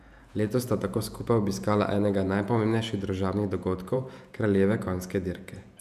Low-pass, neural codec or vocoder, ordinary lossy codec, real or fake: 14.4 kHz; vocoder, 48 kHz, 128 mel bands, Vocos; none; fake